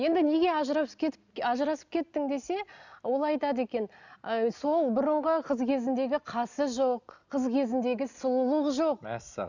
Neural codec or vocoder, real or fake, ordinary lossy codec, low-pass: none; real; none; 7.2 kHz